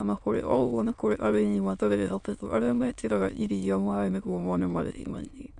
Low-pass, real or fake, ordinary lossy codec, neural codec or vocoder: 9.9 kHz; fake; none; autoencoder, 22.05 kHz, a latent of 192 numbers a frame, VITS, trained on many speakers